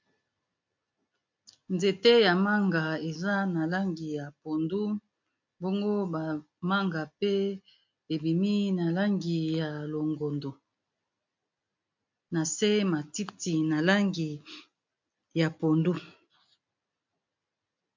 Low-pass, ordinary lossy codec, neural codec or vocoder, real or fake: 7.2 kHz; MP3, 48 kbps; none; real